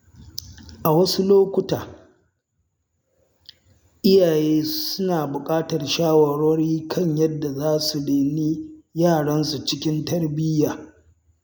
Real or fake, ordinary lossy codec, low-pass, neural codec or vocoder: real; none; none; none